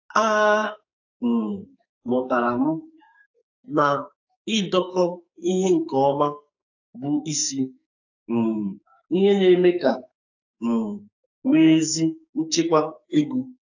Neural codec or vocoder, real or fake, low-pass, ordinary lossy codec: codec, 44.1 kHz, 2.6 kbps, SNAC; fake; 7.2 kHz; AAC, 48 kbps